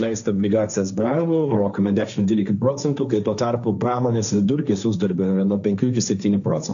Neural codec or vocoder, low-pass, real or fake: codec, 16 kHz, 1.1 kbps, Voila-Tokenizer; 7.2 kHz; fake